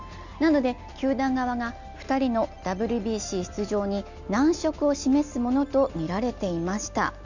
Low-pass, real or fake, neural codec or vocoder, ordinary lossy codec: 7.2 kHz; real; none; none